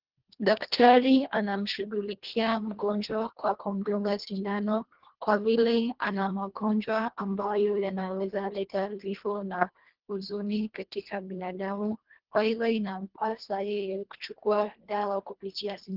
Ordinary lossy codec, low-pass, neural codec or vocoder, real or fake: Opus, 16 kbps; 5.4 kHz; codec, 24 kHz, 1.5 kbps, HILCodec; fake